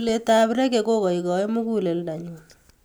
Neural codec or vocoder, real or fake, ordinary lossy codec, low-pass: none; real; none; none